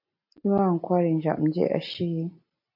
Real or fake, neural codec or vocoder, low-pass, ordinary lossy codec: real; none; 5.4 kHz; MP3, 32 kbps